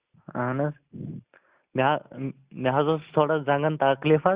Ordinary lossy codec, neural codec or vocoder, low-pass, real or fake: Opus, 64 kbps; none; 3.6 kHz; real